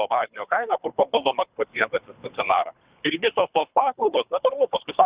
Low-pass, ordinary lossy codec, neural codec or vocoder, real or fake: 3.6 kHz; Opus, 64 kbps; codec, 24 kHz, 3 kbps, HILCodec; fake